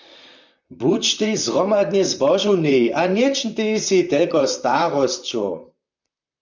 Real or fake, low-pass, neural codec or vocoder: fake; 7.2 kHz; vocoder, 44.1 kHz, 128 mel bands, Pupu-Vocoder